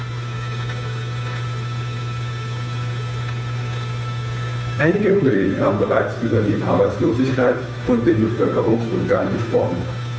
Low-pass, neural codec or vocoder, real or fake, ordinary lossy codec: none; codec, 16 kHz, 2 kbps, FunCodec, trained on Chinese and English, 25 frames a second; fake; none